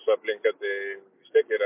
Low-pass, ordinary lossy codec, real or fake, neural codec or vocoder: 3.6 kHz; MP3, 32 kbps; real; none